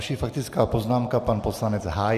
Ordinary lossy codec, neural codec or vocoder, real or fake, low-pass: AAC, 96 kbps; none; real; 14.4 kHz